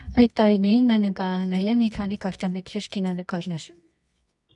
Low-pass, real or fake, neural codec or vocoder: 10.8 kHz; fake; codec, 24 kHz, 0.9 kbps, WavTokenizer, medium music audio release